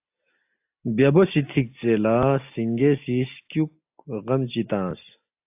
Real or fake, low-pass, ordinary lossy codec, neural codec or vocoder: real; 3.6 kHz; AAC, 32 kbps; none